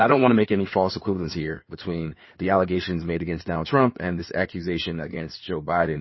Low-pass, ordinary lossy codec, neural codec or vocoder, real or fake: 7.2 kHz; MP3, 24 kbps; codec, 16 kHz in and 24 kHz out, 2.2 kbps, FireRedTTS-2 codec; fake